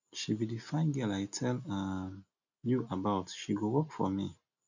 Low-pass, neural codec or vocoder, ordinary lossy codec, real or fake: 7.2 kHz; none; none; real